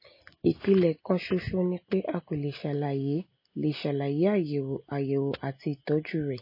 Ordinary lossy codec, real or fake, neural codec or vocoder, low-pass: MP3, 24 kbps; real; none; 5.4 kHz